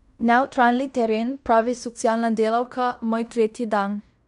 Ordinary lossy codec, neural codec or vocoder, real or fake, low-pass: none; codec, 16 kHz in and 24 kHz out, 0.9 kbps, LongCat-Audio-Codec, fine tuned four codebook decoder; fake; 10.8 kHz